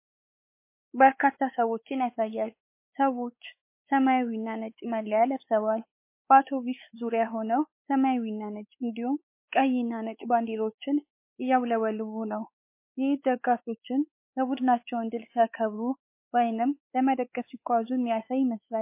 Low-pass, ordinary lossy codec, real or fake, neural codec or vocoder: 3.6 kHz; MP3, 24 kbps; fake; codec, 16 kHz, 4 kbps, X-Codec, WavLM features, trained on Multilingual LibriSpeech